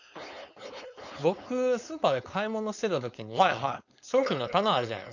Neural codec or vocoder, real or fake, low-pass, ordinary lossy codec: codec, 16 kHz, 4.8 kbps, FACodec; fake; 7.2 kHz; none